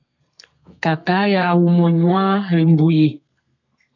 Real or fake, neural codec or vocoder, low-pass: fake; codec, 32 kHz, 1.9 kbps, SNAC; 7.2 kHz